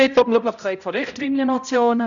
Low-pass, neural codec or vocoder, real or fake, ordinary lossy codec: 7.2 kHz; codec, 16 kHz, 0.5 kbps, X-Codec, HuBERT features, trained on balanced general audio; fake; none